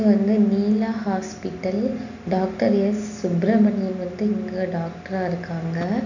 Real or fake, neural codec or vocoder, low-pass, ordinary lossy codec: real; none; 7.2 kHz; none